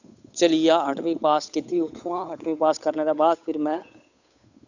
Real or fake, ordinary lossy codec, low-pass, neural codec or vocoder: fake; none; 7.2 kHz; codec, 16 kHz, 8 kbps, FunCodec, trained on Chinese and English, 25 frames a second